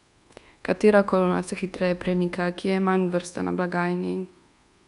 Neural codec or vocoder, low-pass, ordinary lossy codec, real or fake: codec, 24 kHz, 1.2 kbps, DualCodec; 10.8 kHz; none; fake